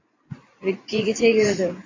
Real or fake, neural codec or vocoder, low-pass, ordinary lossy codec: fake; vocoder, 24 kHz, 100 mel bands, Vocos; 7.2 kHz; AAC, 32 kbps